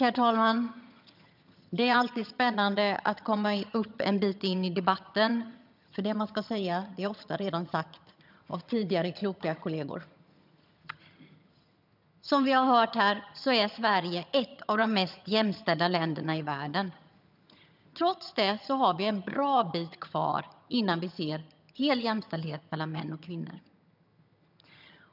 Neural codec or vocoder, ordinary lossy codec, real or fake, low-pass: vocoder, 22.05 kHz, 80 mel bands, HiFi-GAN; none; fake; 5.4 kHz